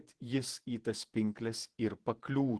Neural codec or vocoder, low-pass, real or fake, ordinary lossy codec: vocoder, 48 kHz, 128 mel bands, Vocos; 10.8 kHz; fake; Opus, 16 kbps